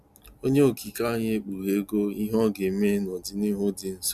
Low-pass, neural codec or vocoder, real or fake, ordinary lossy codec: 14.4 kHz; none; real; none